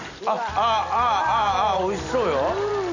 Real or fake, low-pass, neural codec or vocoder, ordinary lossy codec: real; 7.2 kHz; none; none